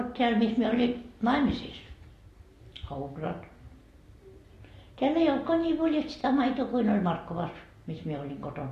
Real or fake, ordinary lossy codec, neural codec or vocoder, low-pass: real; AAC, 48 kbps; none; 14.4 kHz